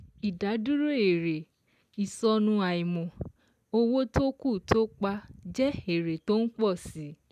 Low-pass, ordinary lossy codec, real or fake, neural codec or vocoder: 14.4 kHz; none; real; none